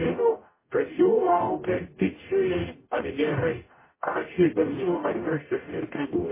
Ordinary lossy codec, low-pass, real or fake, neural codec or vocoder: MP3, 16 kbps; 3.6 kHz; fake; codec, 44.1 kHz, 0.9 kbps, DAC